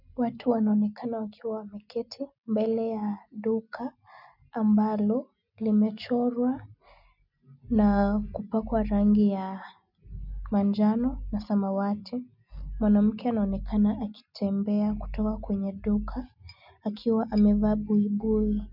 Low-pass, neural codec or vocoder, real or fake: 5.4 kHz; none; real